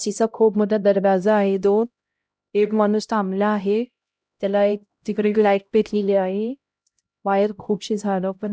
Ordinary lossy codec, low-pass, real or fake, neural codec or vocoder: none; none; fake; codec, 16 kHz, 0.5 kbps, X-Codec, HuBERT features, trained on LibriSpeech